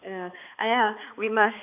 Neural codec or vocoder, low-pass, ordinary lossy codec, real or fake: codec, 16 kHz, 2 kbps, X-Codec, HuBERT features, trained on balanced general audio; 3.6 kHz; none; fake